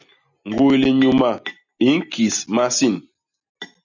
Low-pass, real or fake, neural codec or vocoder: 7.2 kHz; real; none